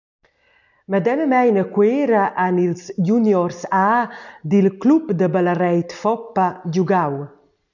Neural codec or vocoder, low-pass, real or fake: none; 7.2 kHz; real